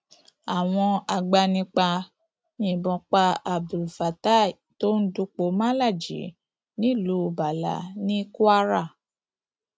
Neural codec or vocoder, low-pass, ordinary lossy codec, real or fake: none; none; none; real